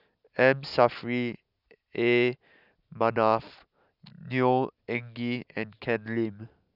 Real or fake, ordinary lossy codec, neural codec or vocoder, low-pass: real; none; none; 5.4 kHz